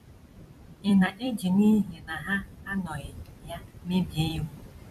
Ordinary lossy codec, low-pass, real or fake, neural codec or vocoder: none; 14.4 kHz; fake; vocoder, 44.1 kHz, 128 mel bands every 256 samples, BigVGAN v2